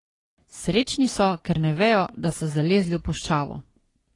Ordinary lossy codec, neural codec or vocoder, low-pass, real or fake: AAC, 32 kbps; codec, 44.1 kHz, 3.4 kbps, Pupu-Codec; 10.8 kHz; fake